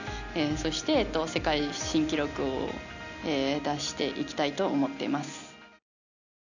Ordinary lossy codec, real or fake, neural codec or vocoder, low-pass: none; real; none; 7.2 kHz